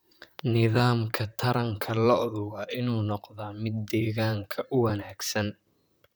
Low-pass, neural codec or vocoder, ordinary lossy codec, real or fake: none; vocoder, 44.1 kHz, 128 mel bands, Pupu-Vocoder; none; fake